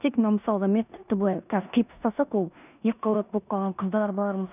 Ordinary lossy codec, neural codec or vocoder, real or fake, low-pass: none; codec, 16 kHz in and 24 kHz out, 0.9 kbps, LongCat-Audio-Codec, four codebook decoder; fake; 3.6 kHz